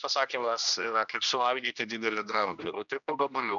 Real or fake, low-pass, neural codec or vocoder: fake; 7.2 kHz; codec, 16 kHz, 1 kbps, X-Codec, HuBERT features, trained on general audio